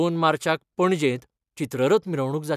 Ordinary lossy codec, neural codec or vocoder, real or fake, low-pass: none; none; real; 14.4 kHz